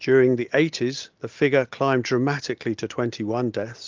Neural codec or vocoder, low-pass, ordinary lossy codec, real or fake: none; 7.2 kHz; Opus, 32 kbps; real